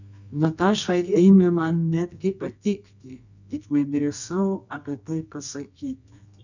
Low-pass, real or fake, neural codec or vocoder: 7.2 kHz; fake; codec, 24 kHz, 0.9 kbps, WavTokenizer, medium music audio release